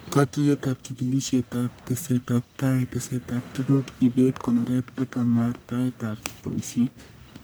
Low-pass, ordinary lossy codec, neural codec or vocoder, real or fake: none; none; codec, 44.1 kHz, 1.7 kbps, Pupu-Codec; fake